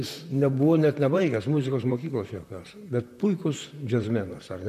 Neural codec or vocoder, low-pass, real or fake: vocoder, 44.1 kHz, 128 mel bands, Pupu-Vocoder; 14.4 kHz; fake